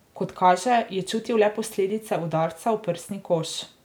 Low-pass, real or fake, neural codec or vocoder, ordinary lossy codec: none; real; none; none